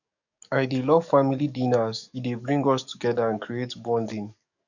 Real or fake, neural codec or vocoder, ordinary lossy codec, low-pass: fake; codec, 44.1 kHz, 7.8 kbps, DAC; none; 7.2 kHz